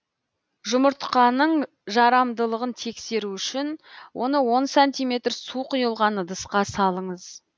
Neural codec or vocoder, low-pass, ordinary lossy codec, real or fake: none; none; none; real